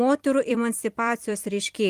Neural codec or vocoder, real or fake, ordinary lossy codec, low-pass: vocoder, 44.1 kHz, 128 mel bands every 256 samples, BigVGAN v2; fake; Opus, 24 kbps; 14.4 kHz